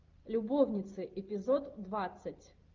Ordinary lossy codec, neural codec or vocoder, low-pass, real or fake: Opus, 32 kbps; none; 7.2 kHz; real